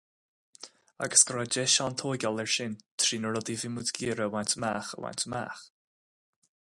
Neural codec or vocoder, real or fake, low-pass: none; real; 10.8 kHz